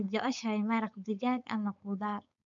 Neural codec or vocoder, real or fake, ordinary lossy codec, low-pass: codec, 16 kHz, 4.8 kbps, FACodec; fake; none; 7.2 kHz